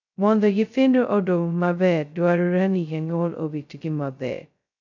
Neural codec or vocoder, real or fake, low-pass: codec, 16 kHz, 0.2 kbps, FocalCodec; fake; 7.2 kHz